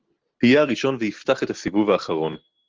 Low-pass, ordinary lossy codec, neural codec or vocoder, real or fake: 7.2 kHz; Opus, 16 kbps; none; real